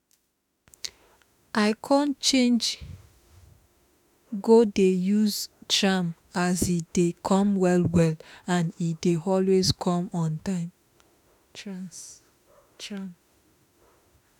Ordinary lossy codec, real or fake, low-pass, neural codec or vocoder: none; fake; 19.8 kHz; autoencoder, 48 kHz, 32 numbers a frame, DAC-VAE, trained on Japanese speech